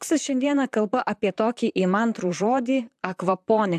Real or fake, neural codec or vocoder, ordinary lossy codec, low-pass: fake; vocoder, 44.1 kHz, 128 mel bands, Pupu-Vocoder; AAC, 96 kbps; 14.4 kHz